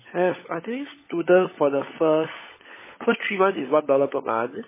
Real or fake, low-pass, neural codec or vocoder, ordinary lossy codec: fake; 3.6 kHz; codec, 16 kHz, 16 kbps, FunCodec, trained on LibriTTS, 50 frames a second; MP3, 16 kbps